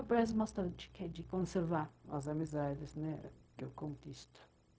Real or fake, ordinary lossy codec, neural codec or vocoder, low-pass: fake; none; codec, 16 kHz, 0.4 kbps, LongCat-Audio-Codec; none